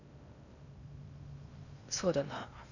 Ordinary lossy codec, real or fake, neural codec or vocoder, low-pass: none; fake; codec, 16 kHz in and 24 kHz out, 0.6 kbps, FocalCodec, streaming, 2048 codes; 7.2 kHz